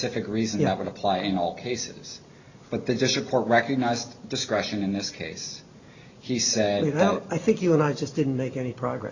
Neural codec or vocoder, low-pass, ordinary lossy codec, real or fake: none; 7.2 kHz; Opus, 64 kbps; real